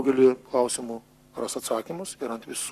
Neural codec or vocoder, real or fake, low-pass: codec, 44.1 kHz, 7.8 kbps, Pupu-Codec; fake; 14.4 kHz